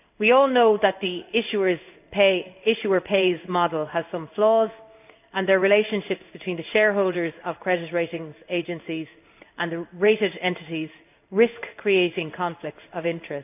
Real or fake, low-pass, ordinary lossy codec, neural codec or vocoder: fake; 3.6 kHz; none; codec, 16 kHz in and 24 kHz out, 1 kbps, XY-Tokenizer